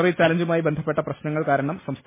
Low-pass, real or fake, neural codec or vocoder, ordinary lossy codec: 3.6 kHz; real; none; MP3, 16 kbps